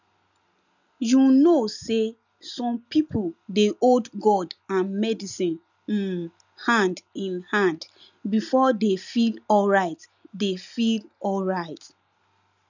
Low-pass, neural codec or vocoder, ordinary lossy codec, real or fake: 7.2 kHz; none; none; real